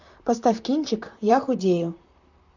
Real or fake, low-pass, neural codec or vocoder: fake; 7.2 kHz; vocoder, 44.1 kHz, 128 mel bands, Pupu-Vocoder